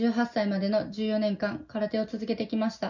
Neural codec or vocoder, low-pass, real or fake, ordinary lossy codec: none; 7.2 kHz; real; none